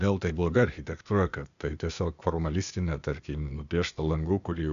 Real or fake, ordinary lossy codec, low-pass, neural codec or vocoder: fake; MP3, 96 kbps; 7.2 kHz; codec, 16 kHz, 0.8 kbps, ZipCodec